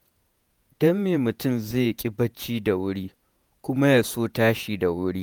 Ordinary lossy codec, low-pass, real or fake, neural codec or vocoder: none; none; fake; vocoder, 48 kHz, 128 mel bands, Vocos